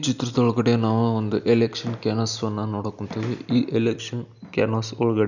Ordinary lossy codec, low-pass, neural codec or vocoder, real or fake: none; 7.2 kHz; none; real